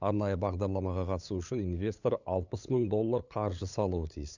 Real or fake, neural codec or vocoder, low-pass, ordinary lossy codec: fake; codec, 16 kHz, 8 kbps, FunCodec, trained on LibriTTS, 25 frames a second; 7.2 kHz; none